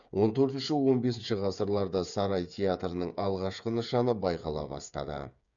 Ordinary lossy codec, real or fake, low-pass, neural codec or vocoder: none; fake; 7.2 kHz; codec, 16 kHz, 8 kbps, FreqCodec, smaller model